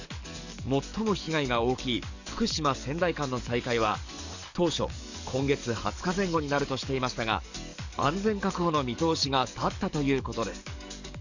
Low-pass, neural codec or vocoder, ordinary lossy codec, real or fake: 7.2 kHz; codec, 44.1 kHz, 7.8 kbps, DAC; none; fake